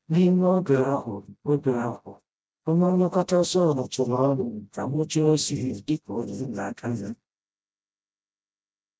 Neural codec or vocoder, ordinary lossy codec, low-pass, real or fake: codec, 16 kHz, 0.5 kbps, FreqCodec, smaller model; none; none; fake